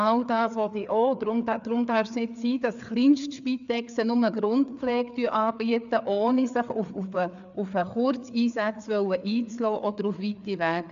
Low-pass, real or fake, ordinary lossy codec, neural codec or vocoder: 7.2 kHz; fake; AAC, 96 kbps; codec, 16 kHz, 4 kbps, FreqCodec, larger model